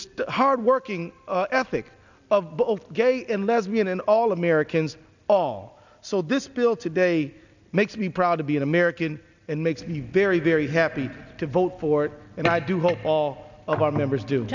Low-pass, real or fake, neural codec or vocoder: 7.2 kHz; real; none